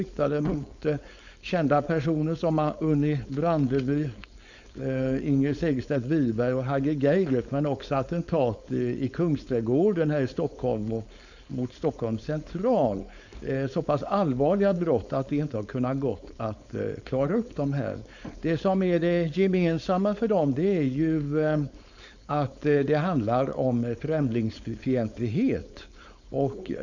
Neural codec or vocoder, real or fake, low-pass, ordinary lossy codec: codec, 16 kHz, 4.8 kbps, FACodec; fake; 7.2 kHz; none